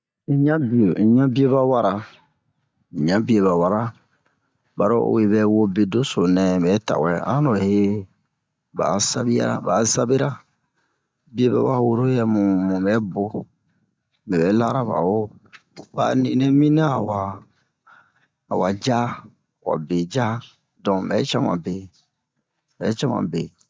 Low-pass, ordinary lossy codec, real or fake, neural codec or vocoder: none; none; real; none